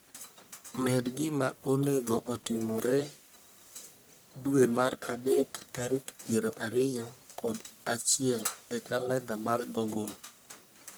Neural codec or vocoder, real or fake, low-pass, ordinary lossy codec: codec, 44.1 kHz, 1.7 kbps, Pupu-Codec; fake; none; none